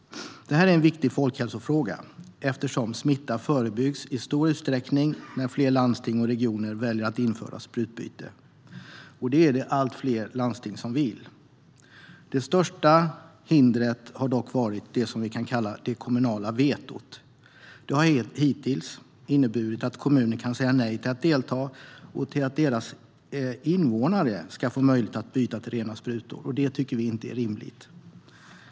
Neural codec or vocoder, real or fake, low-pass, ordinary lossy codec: none; real; none; none